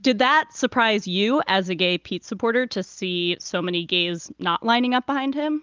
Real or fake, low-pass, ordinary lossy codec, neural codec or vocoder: real; 7.2 kHz; Opus, 32 kbps; none